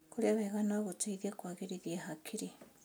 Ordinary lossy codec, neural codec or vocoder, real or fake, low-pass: none; none; real; none